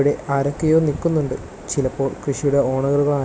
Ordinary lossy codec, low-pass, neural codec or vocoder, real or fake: none; none; none; real